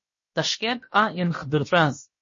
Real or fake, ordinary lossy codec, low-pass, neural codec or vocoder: fake; MP3, 32 kbps; 7.2 kHz; codec, 16 kHz, about 1 kbps, DyCAST, with the encoder's durations